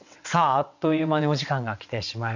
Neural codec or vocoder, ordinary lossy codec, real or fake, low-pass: vocoder, 22.05 kHz, 80 mel bands, WaveNeXt; none; fake; 7.2 kHz